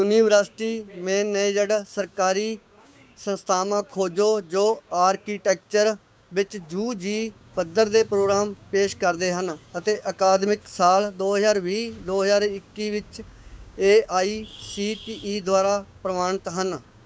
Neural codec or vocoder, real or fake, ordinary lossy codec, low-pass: codec, 16 kHz, 6 kbps, DAC; fake; none; none